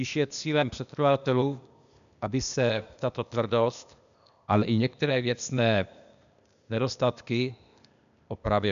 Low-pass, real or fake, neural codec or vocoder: 7.2 kHz; fake; codec, 16 kHz, 0.8 kbps, ZipCodec